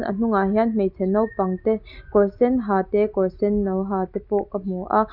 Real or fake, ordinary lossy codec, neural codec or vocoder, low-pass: real; none; none; 5.4 kHz